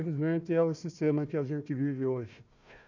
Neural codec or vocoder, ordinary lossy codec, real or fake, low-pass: codec, 16 kHz, 1 kbps, FunCodec, trained on Chinese and English, 50 frames a second; none; fake; 7.2 kHz